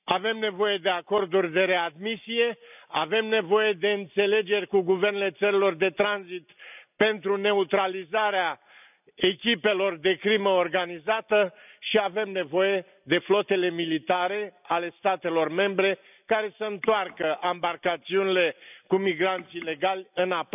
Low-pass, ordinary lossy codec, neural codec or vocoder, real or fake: 3.6 kHz; none; none; real